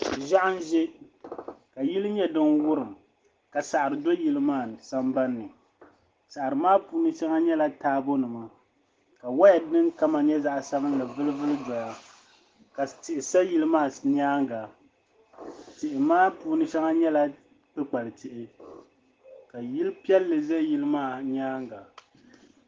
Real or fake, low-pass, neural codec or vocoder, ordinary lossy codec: real; 7.2 kHz; none; Opus, 16 kbps